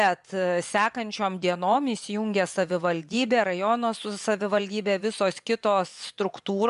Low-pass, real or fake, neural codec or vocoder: 10.8 kHz; fake; vocoder, 24 kHz, 100 mel bands, Vocos